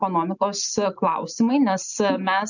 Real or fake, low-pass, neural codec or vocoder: real; 7.2 kHz; none